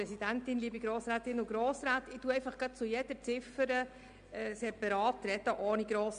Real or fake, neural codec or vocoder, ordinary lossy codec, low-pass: real; none; MP3, 64 kbps; 9.9 kHz